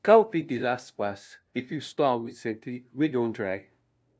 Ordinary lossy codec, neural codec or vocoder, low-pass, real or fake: none; codec, 16 kHz, 0.5 kbps, FunCodec, trained on LibriTTS, 25 frames a second; none; fake